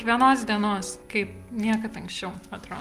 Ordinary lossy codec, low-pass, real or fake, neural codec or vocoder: Opus, 32 kbps; 14.4 kHz; real; none